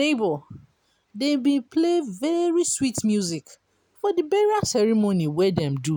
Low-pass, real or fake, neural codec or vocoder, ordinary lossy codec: 19.8 kHz; real; none; none